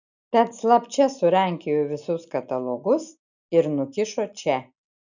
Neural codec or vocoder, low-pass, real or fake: none; 7.2 kHz; real